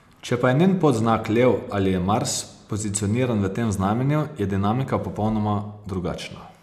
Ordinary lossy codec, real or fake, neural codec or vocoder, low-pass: none; real; none; 14.4 kHz